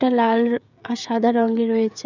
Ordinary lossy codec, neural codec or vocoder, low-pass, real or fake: none; codec, 16 kHz, 16 kbps, FreqCodec, smaller model; 7.2 kHz; fake